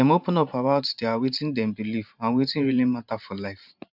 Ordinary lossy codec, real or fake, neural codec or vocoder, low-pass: none; fake; vocoder, 44.1 kHz, 128 mel bands every 512 samples, BigVGAN v2; 5.4 kHz